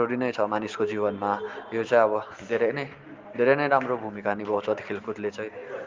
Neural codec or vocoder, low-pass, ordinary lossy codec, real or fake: none; 7.2 kHz; Opus, 32 kbps; real